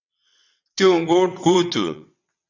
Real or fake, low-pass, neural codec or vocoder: fake; 7.2 kHz; vocoder, 22.05 kHz, 80 mel bands, WaveNeXt